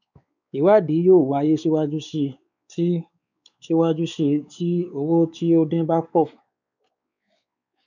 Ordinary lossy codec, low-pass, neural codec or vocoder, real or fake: none; 7.2 kHz; codec, 16 kHz, 4 kbps, X-Codec, WavLM features, trained on Multilingual LibriSpeech; fake